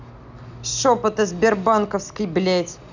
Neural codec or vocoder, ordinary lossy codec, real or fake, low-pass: none; none; real; 7.2 kHz